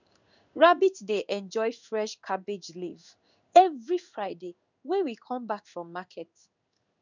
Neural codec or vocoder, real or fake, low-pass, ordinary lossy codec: codec, 16 kHz in and 24 kHz out, 1 kbps, XY-Tokenizer; fake; 7.2 kHz; none